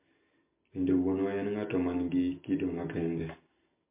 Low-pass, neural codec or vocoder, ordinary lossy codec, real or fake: 3.6 kHz; none; MP3, 24 kbps; real